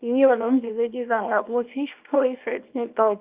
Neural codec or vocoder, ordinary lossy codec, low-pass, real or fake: codec, 24 kHz, 0.9 kbps, WavTokenizer, small release; Opus, 24 kbps; 3.6 kHz; fake